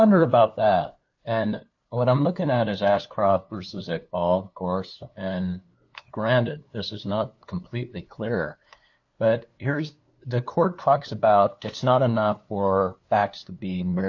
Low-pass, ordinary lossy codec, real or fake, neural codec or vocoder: 7.2 kHz; AAC, 48 kbps; fake; codec, 16 kHz, 2 kbps, FunCodec, trained on LibriTTS, 25 frames a second